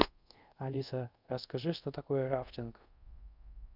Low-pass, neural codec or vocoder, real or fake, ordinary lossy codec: 5.4 kHz; codec, 24 kHz, 0.5 kbps, DualCodec; fake; Opus, 64 kbps